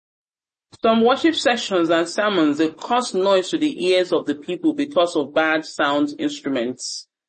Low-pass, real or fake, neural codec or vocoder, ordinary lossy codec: 10.8 kHz; real; none; MP3, 32 kbps